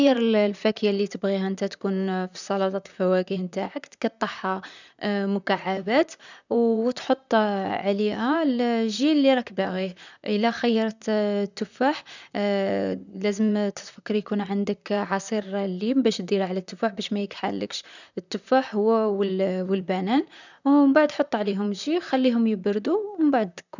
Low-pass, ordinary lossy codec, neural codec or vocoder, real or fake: 7.2 kHz; none; vocoder, 44.1 kHz, 128 mel bands, Pupu-Vocoder; fake